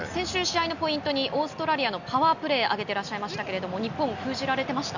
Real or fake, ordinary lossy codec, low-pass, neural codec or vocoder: real; none; 7.2 kHz; none